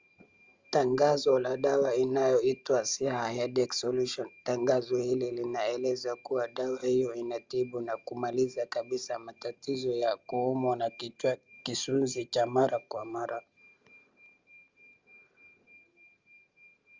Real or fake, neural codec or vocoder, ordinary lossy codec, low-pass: real; none; Opus, 32 kbps; 7.2 kHz